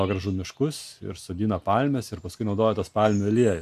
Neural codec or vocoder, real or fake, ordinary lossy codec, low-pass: none; real; AAC, 96 kbps; 14.4 kHz